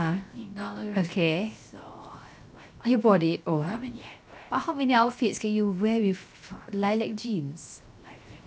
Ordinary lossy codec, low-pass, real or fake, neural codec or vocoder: none; none; fake; codec, 16 kHz, 0.7 kbps, FocalCodec